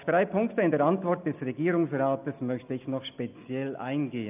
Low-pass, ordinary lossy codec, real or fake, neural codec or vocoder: 3.6 kHz; none; real; none